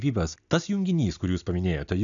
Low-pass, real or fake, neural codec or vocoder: 7.2 kHz; real; none